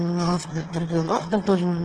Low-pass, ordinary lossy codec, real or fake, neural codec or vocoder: 9.9 kHz; Opus, 16 kbps; fake; autoencoder, 22.05 kHz, a latent of 192 numbers a frame, VITS, trained on one speaker